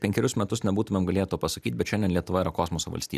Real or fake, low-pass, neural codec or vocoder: fake; 14.4 kHz; vocoder, 44.1 kHz, 128 mel bands every 256 samples, BigVGAN v2